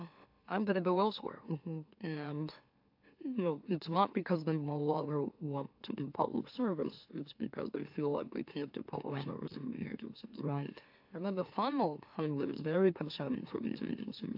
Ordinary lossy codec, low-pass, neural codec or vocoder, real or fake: AAC, 48 kbps; 5.4 kHz; autoencoder, 44.1 kHz, a latent of 192 numbers a frame, MeloTTS; fake